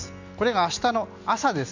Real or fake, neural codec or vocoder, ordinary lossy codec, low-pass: real; none; none; 7.2 kHz